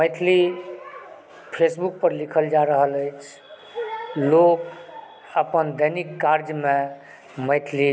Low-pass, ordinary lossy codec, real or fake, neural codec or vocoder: none; none; real; none